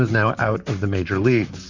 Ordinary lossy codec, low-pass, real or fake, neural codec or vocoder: Opus, 64 kbps; 7.2 kHz; fake; vocoder, 44.1 kHz, 128 mel bands, Pupu-Vocoder